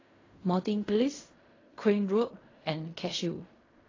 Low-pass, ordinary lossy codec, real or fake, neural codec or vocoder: 7.2 kHz; AAC, 32 kbps; fake; codec, 16 kHz in and 24 kHz out, 0.4 kbps, LongCat-Audio-Codec, fine tuned four codebook decoder